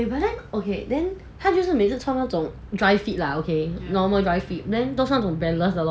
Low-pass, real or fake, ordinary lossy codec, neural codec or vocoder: none; real; none; none